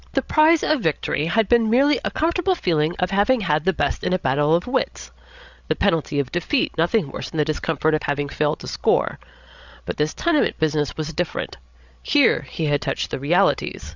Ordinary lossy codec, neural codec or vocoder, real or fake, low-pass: Opus, 64 kbps; codec, 16 kHz, 16 kbps, FreqCodec, larger model; fake; 7.2 kHz